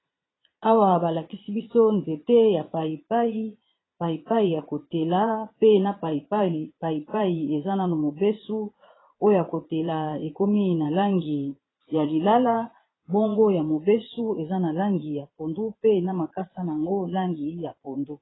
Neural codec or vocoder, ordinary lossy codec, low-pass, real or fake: vocoder, 22.05 kHz, 80 mel bands, Vocos; AAC, 16 kbps; 7.2 kHz; fake